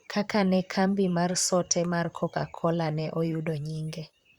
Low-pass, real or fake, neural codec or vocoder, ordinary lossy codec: 19.8 kHz; fake; vocoder, 44.1 kHz, 128 mel bands, Pupu-Vocoder; Opus, 64 kbps